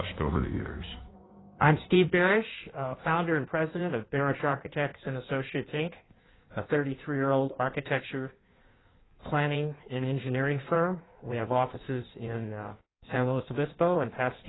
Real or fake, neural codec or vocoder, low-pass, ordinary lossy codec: fake; codec, 16 kHz in and 24 kHz out, 1.1 kbps, FireRedTTS-2 codec; 7.2 kHz; AAC, 16 kbps